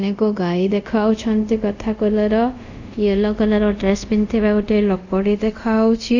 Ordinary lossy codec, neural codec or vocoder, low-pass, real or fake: none; codec, 24 kHz, 0.5 kbps, DualCodec; 7.2 kHz; fake